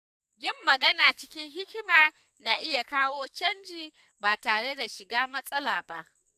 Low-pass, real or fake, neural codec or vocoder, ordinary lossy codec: 14.4 kHz; fake; codec, 44.1 kHz, 2.6 kbps, SNAC; none